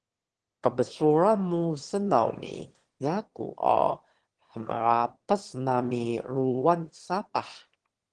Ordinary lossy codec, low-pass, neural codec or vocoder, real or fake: Opus, 16 kbps; 9.9 kHz; autoencoder, 22.05 kHz, a latent of 192 numbers a frame, VITS, trained on one speaker; fake